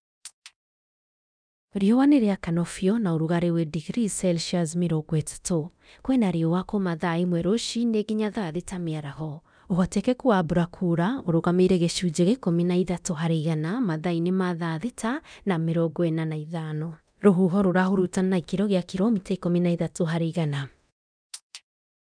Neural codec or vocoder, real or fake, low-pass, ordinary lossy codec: codec, 24 kHz, 0.9 kbps, DualCodec; fake; 9.9 kHz; none